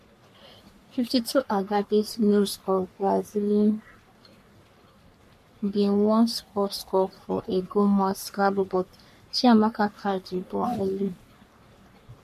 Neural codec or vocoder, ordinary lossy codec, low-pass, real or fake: codec, 44.1 kHz, 3.4 kbps, Pupu-Codec; MP3, 64 kbps; 14.4 kHz; fake